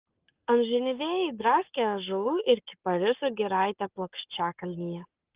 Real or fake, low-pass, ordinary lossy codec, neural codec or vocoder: fake; 3.6 kHz; Opus, 32 kbps; codec, 44.1 kHz, 7.8 kbps, Pupu-Codec